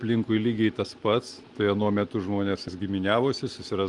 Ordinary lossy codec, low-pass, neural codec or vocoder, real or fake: Opus, 24 kbps; 10.8 kHz; none; real